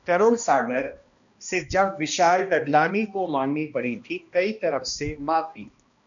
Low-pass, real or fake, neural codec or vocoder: 7.2 kHz; fake; codec, 16 kHz, 1 kbps, X-Codec, HuBERT features, trained on balanced general audio